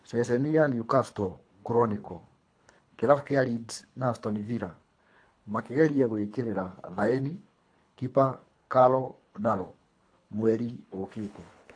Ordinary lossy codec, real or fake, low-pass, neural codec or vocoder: AAC, 48 kbps; fake; 9.9 kHz; codec, 24 kHz, 3 kbps, HILCodec